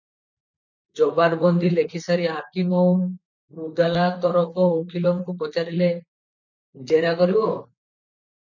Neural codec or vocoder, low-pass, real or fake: vocoder, 44.1 kHz, 128 mel bands, Pupu-Vocoder; 7.2 kHz; fake